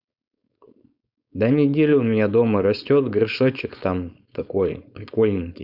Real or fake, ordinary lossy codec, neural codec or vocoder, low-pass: fake; none; codec, 16 kHz, 4.8 kbps, FACodec; 5.4 kHz